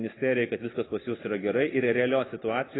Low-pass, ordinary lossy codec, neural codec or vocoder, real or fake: 7.2 kHz; AAC, 16 kbps; none; real